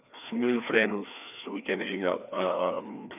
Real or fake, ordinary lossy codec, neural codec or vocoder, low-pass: fake; none; codec, 16 kHz, 2 kbps, FreqCodec, larger model; 3.6 kHz